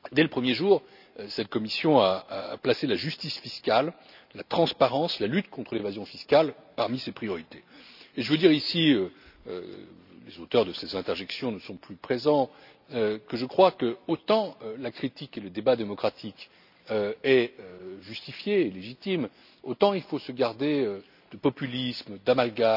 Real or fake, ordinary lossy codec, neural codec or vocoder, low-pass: real; none; none; 5.4 kHz